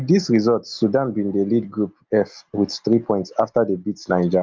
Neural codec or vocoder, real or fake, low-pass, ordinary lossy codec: none; real; 7.2 kHz; Opus, 24 kbps